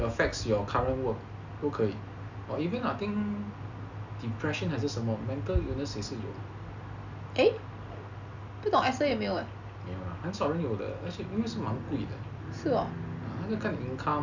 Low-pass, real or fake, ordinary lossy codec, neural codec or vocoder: 7.2 kHz; real; none; none